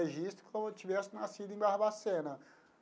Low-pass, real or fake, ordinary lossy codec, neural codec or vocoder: none; real; none; none